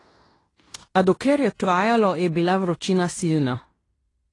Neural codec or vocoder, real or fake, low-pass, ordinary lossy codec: codec, 16 kHz in and 24 kHz out, 0.9 kbps, LongCat-Audio-Codec, fine tuned four codebook decoder; fake; 10.8 kHz; AAC, 32 kbps